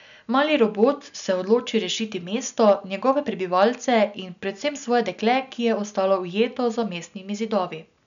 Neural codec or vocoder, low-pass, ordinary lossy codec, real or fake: none; 7.2 kHz; none; real